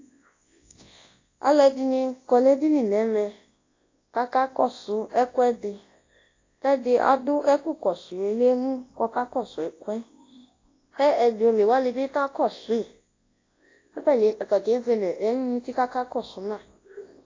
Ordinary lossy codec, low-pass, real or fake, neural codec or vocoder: AAC, 32 kbps; 7.2 kHz; fake; codec, 24 kHz, 0.9 kbps, WavTokenizer, large speech release